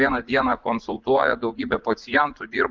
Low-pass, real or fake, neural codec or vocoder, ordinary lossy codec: 7.2 kHz; fake; vocoder, 44.1 kHz, 80 mel bands, Vocos; Opus, 32 kbps